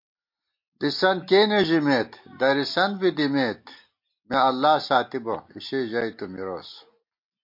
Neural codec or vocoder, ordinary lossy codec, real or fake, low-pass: none; MP3, 32 kbps; real; 5.4 kHz